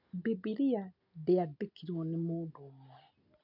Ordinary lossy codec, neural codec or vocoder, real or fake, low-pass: none; none; real; 5.4 kHz